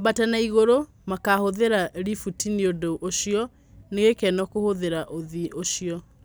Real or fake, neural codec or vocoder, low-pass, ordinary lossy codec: real; none; none; none